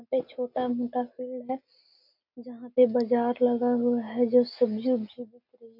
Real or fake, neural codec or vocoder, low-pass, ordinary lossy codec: real; none; 5.4 kHz; none